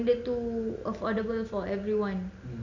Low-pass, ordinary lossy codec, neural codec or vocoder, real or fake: 7.2 kHz; none; none; real